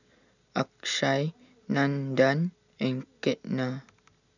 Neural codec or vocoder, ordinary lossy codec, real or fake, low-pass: none; none; real; 7.2 kHz